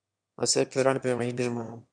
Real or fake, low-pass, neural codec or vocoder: fake; 9.9 kHz; autoencoder, 22.05 kHz, a latent of 192 numbers a frame, VITS, trained on one speaker